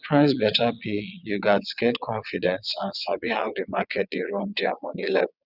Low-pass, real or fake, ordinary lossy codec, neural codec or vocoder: 5.4 kHz; fake; none; vocoder, 22.05 kHz, 80 mel bands, WaveNeXt